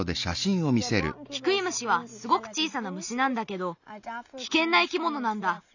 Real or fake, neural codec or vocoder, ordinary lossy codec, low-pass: real; none; none; 7.2 kHz